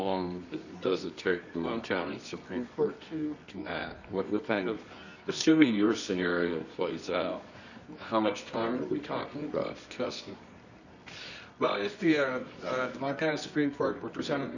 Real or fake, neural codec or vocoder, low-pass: fake; codec, 24 kHz, 0.9 kbps, WavTokenizer, medium music audio release; 7.2 kHz